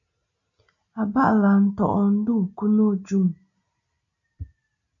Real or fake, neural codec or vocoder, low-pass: real; none; 7.2 kHz